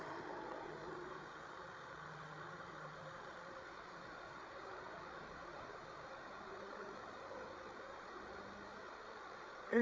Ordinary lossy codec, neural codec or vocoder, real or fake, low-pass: none; codec, 16 kHz, 8 kbps, FreqCodec, larger model; fake; none